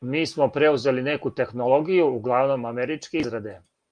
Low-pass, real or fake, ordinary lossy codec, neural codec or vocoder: 9.9 kHz; real; Opus, 32 kbps; none